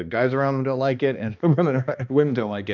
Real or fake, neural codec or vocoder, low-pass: fake; codec, 16 kHz, 2 kbps, X-Codec, HuBERT features, trained on balanced general audio; 7.2 kHz